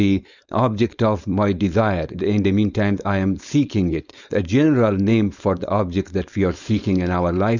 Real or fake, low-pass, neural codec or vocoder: fake; 7.2 kHz; codec, 16 kHz, 4.8 kbps, FACodec